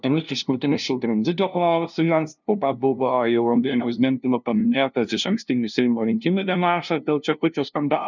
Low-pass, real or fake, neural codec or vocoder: 7.2 kHz; fake; codec, 16 kHz, 0.5 kbps, FunCodec, trained on LibriTTS, 25 frames a second